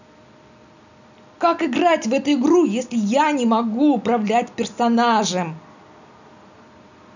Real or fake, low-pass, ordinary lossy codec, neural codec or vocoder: real; 7.2 kHz; none; none